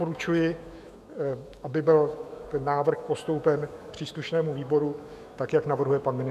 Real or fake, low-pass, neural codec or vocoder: fake; 14.4 kHz; autoencoder, 48 kHz, 128 numbers a frame, DAC-VAE, trained on Japanese speech